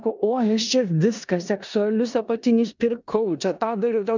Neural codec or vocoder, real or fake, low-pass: codec, 16 kHz in and 24 kHz out, 0.9 kbps, LongCat-Audio-Codec, four codebook decoder; fake; 7.2 kHz